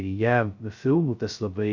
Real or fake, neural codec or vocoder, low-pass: fake; codec, 16 kHz, 0.2 kbps, FocalCodec; 7.2 kHz